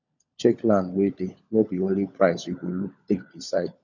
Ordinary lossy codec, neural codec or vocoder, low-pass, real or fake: none; codec, 16 kHz, 16 kbps, FunCodec, trained on LibriTTS, 50 frames a second; 7.2 kHz; fake